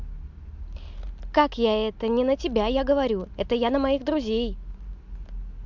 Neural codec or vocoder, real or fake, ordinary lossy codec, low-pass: none; real; MP3, 64 kbps; 7.2 kHz